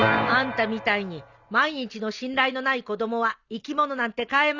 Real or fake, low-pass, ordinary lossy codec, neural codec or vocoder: real; 7.2 kHz; Opus, 64 kbps; none